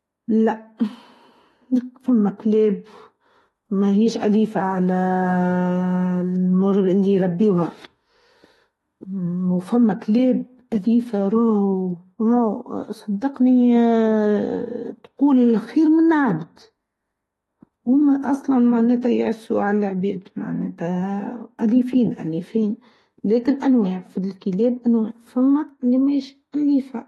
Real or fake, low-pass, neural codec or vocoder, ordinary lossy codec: fake; 19.8 kHz; autoencoder, 48 kHz, 32 numbers a frame, DAC-VAE, trained on Japanese speech; AAC, 32 kbps